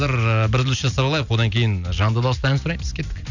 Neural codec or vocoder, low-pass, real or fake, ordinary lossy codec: none; 7.2 kHz; real; none